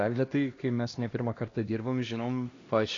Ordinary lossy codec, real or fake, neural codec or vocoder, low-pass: AAC, 32 kbps; fake; codec, 16 kHz, 1 kbps, X-Codec, HuBERT features, trained on LibriSpeech; 7.2 kHz